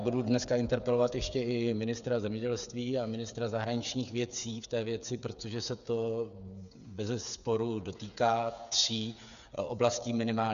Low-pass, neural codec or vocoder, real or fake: 7.2 kHz; codec, 16 kHz, 8 kbps, FreqCodec, smaller model; fake